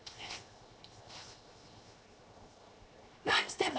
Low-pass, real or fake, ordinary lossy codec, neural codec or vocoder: none; fake; none; codec, 16 kHz, 0.7 kbps, FocalCodec